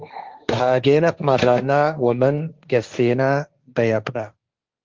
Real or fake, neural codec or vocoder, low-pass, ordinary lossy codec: fake; codec, 16 kHz, 1.1 kbps, Voila-Tokenizer; 7.2 kHz; Opus, 32 kbps